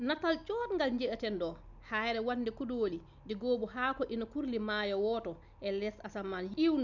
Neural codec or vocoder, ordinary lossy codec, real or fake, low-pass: none; none; real; 7.2 kHz